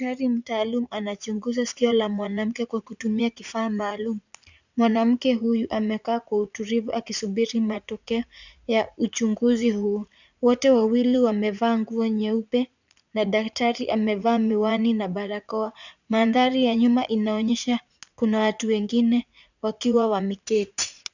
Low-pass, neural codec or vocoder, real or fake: 7.2 kHz; vocoder, 44.1 kHz, 80 mel bands, Vocos; fake